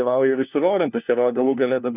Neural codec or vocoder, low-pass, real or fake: codec, 16 kHz, 1 kbps, FunCodec, trained on LibriTTS, 50 frames a second; 3.6 kHz; fake